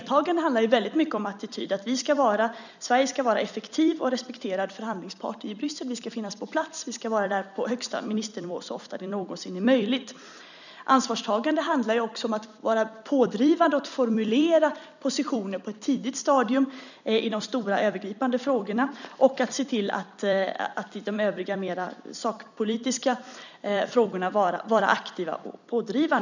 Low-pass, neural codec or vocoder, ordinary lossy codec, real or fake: 7.2 kHz; vocoder, 44.1 kHz, 128 mel bands every 256 samples, BigVGAN v2; none; fake